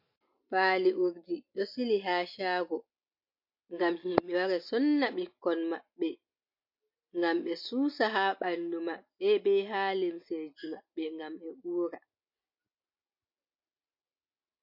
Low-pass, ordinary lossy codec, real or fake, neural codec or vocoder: 5.4 kHz; MP3, 32 kbps; real; none